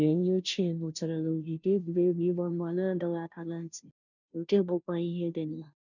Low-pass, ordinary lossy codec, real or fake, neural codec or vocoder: 7.2 kHz; none; fake; codec, 16 kHz, 0.5 kbps, FunCodec, trained on Chinese and English, 25 frames a second